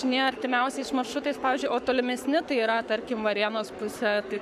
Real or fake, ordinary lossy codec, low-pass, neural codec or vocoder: fake; AAC, 96 kbps; 14.4 kHz; codec, 44.1 kHz, 7.8 kbps, Pupu-Codec